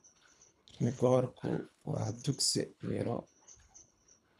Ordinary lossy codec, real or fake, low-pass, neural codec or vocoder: none; fake; none; codec, 24 kHz, 3 kbps, HILCodec